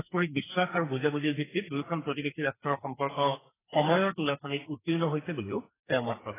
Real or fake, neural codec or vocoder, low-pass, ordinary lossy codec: fake; codec, 44.1 kHz, 2.6 kbps, SNAC; 3.6 kHz; AAC, 16 kbps